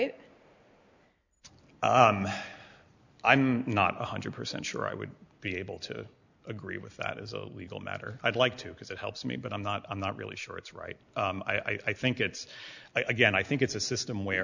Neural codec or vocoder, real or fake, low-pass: none; real; 7.2 kHz